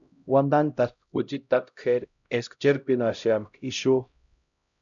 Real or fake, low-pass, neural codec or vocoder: fake; 7.2 kHz; codec, 16 kHz, 0.5 kbps, X-Codec, HuBERT features, trained on LibriSpeech